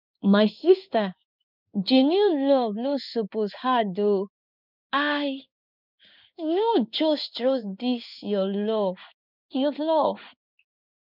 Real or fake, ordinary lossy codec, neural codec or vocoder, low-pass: fake; none; codec, 16 kHz in and 24 kHz out, 1 kbps, XY-Tokenizer; 5.4 kHz